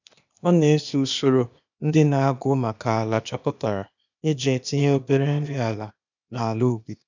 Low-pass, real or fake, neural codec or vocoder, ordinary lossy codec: 7.2 kHz; fake; codec, 16 kHz, 0.8 kbps, ZipCodec; none